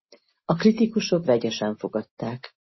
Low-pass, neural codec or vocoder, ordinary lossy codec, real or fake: 7.2 kHz; none; MP3, 24 kbps; real